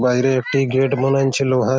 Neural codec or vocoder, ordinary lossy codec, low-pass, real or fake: none; none; none; real